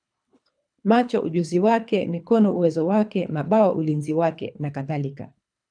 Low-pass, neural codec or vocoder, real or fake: 9.9 kHz; codec, 24 kHz, 3 kbps, HILCodec; fake